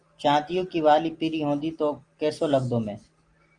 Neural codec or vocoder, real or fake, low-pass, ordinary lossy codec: none; real; 9.9 kHz; Opus, 24 kbps